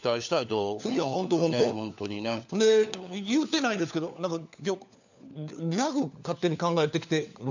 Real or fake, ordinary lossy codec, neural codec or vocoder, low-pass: fake; none; codec, 16 kHz, 4 kbps, FunCodec, trained on LibriTTS, 50 frames a second; 7.2 kHz